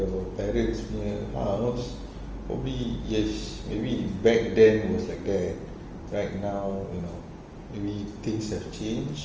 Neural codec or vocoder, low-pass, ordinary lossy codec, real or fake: none; 7.2 kHz; Opus, 24 kbps; real